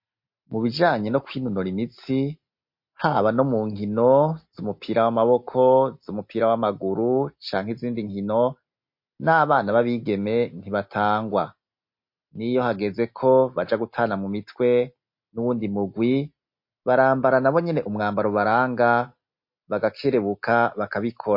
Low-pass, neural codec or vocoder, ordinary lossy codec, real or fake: 5.4 kHz; none; MP3, 32 kbps; real